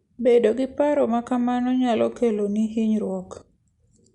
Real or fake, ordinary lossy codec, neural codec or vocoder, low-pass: real; Opus, 64 kbps; none; 10.8 kHz